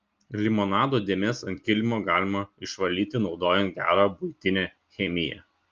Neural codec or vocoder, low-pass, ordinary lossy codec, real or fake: none; 7.2 kHz; Opus, 24 kbps; real